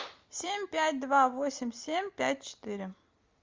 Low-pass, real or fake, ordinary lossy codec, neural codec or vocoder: 7.2 kHz; real; Opus, 32 kbps; none